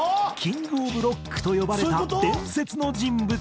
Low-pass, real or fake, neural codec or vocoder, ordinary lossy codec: none; real; none; none